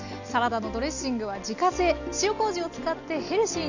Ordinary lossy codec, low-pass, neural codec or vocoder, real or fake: none; 7.2 kHz; none; real